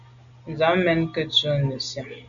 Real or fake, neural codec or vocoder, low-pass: real; none; 7.2 kHz